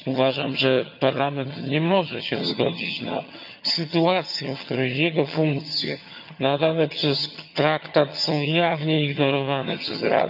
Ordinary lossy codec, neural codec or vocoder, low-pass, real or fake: none; vocoder, 22.05 kHz, 80 mel bands, HiFi-GAN; 5.4 kHz; fake